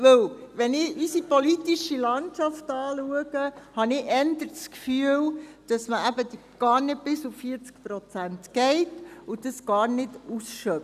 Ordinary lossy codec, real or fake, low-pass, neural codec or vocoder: none; real; 14.4 kHz; none